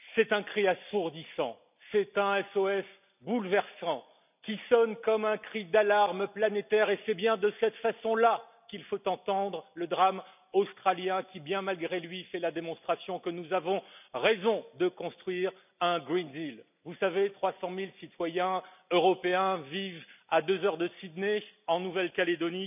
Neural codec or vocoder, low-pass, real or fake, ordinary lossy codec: none; 3.6 kHz; real; none